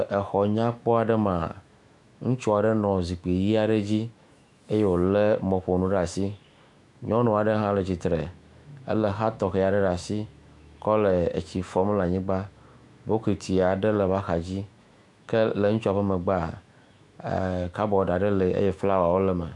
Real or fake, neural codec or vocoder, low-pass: fake; autoencoder, 48 kHz, 128 numbers a frame, DAC-VAE, trained on Japanese speech; 10.8 kHz